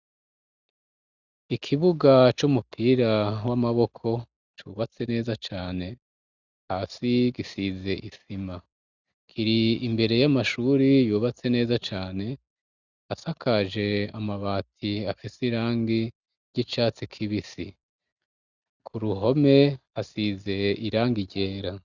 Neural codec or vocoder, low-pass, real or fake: none; 7.2 kHz; real